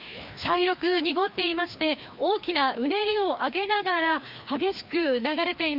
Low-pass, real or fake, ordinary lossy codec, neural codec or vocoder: 5.4 kHz; fake; none; codec, 16 kHz, 2 kbps, FreqCodec, larger model